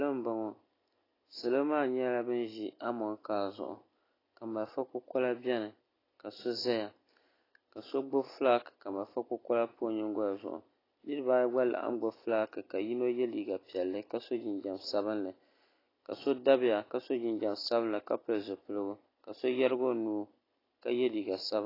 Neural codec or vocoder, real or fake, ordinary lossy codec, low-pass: none; real; AAC, 24 kbps; 5.4 kHz